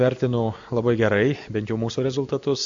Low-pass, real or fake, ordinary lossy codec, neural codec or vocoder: 7.2 kHz; real; MP3, 64 kbps; none